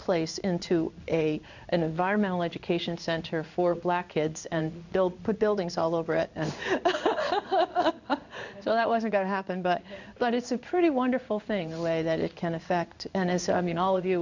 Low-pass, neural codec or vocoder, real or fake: 7.2 kHz; codec, 16 kHz in and 24 kHz out, 1 kbps, XY-Tokenizer; fake